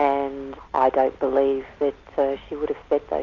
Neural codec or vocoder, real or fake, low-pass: none; real; 7.2 kHz